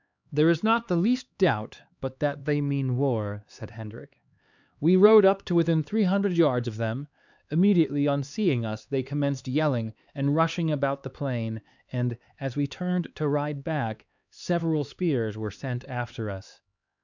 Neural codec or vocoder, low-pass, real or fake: codec, 16 kHz, 4 kbps, X-Codec, HuBERT features, trained on LibriSpeech; 7.2 kHz; fake